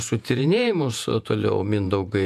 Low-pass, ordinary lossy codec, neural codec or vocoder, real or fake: 14.4 kHz; MP3, 96 kbps; vocoder, 48 kHz, 128 mel bands, Vocos; fake